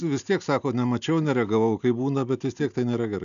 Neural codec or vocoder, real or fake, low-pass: none; real; 7.2 kHz